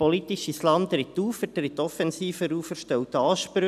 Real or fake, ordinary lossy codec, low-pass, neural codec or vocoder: real; none; 14.4 kHz; none